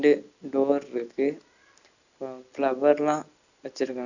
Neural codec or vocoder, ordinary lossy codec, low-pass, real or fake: none; none; 7.2 kHz; real